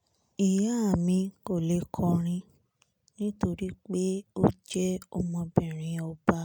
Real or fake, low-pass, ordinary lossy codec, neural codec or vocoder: real; none; none; none